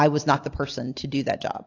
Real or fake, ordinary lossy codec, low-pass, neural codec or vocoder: real; AAC, 48 kbps; 7.2 kHz; none